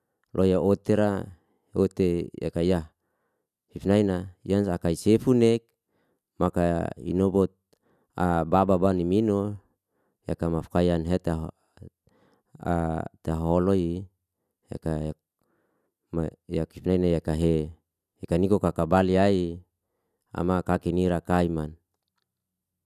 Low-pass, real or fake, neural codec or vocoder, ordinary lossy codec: 14.4 kHz; real; none; none